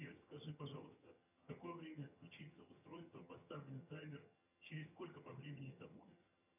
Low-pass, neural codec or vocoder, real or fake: 3.6 kHz; vocoder, 22.05 kHz, 80 mel bands, HiFi-GAN; fake